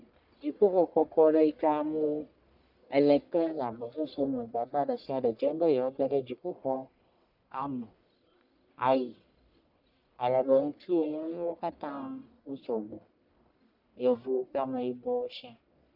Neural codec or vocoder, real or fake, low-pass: codec, 44.1 kHz, 1.7 kbps, Pupu-Codec; fake; 5.4 kHz